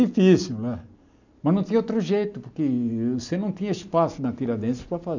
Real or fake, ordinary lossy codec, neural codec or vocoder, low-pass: real; none; none; 7.2 kHz